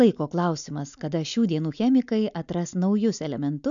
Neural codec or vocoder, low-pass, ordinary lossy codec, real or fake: none; 7.2 kHz; MP3, 96 kbps; real